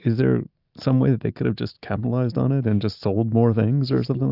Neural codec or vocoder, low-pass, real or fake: none; 5.4 kHz; real